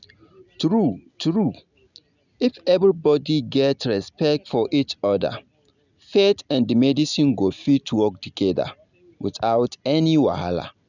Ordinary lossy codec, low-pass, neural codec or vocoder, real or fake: none; 7.2 kHz; none; real